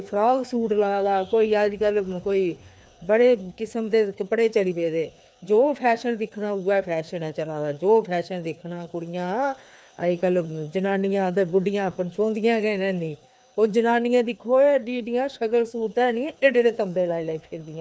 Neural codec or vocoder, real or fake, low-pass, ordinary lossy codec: codec, 16 kHz, 2 kbps, FreqCodec, larger model; fake; none; none